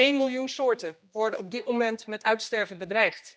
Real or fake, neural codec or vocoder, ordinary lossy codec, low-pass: fake; codec, 16 kHz, 1 kbps, X-Codec, HuBERT features, trained on general audio; none; none